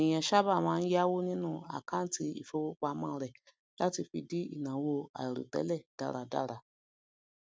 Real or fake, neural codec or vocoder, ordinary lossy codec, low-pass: real; none; none; none